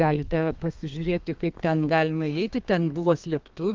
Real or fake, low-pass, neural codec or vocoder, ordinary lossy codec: fake; 7.2 kHz; codec, 32 kHz, 1.9 kbps, SNAC; Opus, 24 kbps